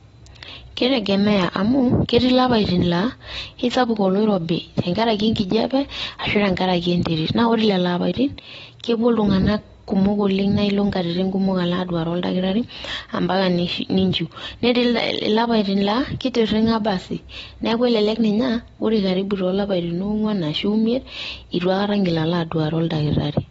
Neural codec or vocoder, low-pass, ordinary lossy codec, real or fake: none; 19.8 kHz; AAC, 24 kbps; real